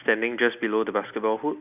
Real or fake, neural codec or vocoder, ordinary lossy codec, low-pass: real; none; none; 3.6 kHz